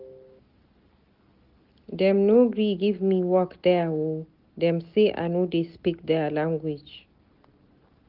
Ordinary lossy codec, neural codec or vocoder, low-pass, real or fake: Opus, 32 kbps; none; 5.4 kHz; real